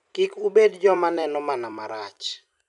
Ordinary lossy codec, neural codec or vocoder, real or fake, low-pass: none; vocoder, 44.1 kHz, 128 mel bands every 256 samples, BigVGAN v2; fake; 10.8 kHz